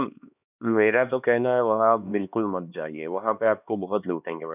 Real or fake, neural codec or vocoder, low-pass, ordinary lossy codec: fake; codec, 16 kHz, 2 kbps, X-Codec, HuBERT features, trained on LibriSpeech; 3.6 kHz; none